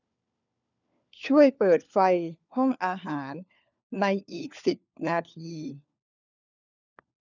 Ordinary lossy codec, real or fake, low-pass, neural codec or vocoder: none; fake; 7.2 kHz; codec, 16 kHz, 4 kbps, FunCodec, trained on LibriTTS, 50 frames a second